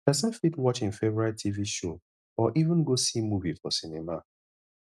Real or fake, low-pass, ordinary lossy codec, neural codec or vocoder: real; none; none; none